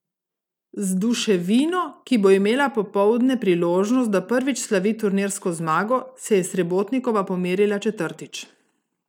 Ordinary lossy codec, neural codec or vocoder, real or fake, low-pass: none; none; real; 19.8 kHz